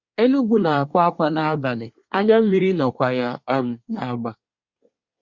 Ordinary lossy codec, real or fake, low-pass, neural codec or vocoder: Opus, 64 kbps; fake; 7.2 kHz; codec, 24 kHz, 1 kbps, SNAC